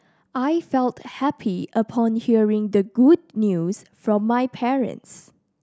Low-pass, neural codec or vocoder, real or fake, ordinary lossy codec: none; none; real; none